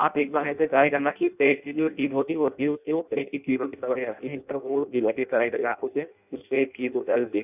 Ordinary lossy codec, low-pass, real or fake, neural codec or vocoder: none; 3.6 kHz; fake; codec, 16 kHz in and 24 kHz out, 0.6 kbps, FireRedTTS-2 codec